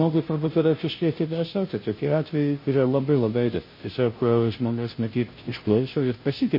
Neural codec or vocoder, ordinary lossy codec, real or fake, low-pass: codec, 16 kHz, 0.5 kbps, FunCodec, trained on Chinese and English, 25 frames a second; MP3, 24 kbps; fake; 5.4 kHz